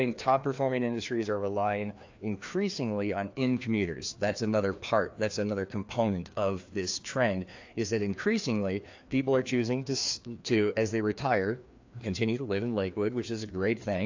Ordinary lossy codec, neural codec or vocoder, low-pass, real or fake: AAC, 48 kbps; codec, 16 kHz, 2 kbps, FreqCodec, larger model; 7.2 kHz; fake